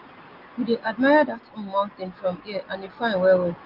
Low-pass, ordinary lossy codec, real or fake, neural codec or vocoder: 5.4 kHz; Opus, 24 kbps; real; none